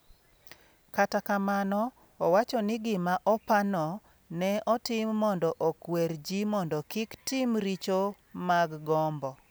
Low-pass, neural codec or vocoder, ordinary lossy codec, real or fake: none; none; none; real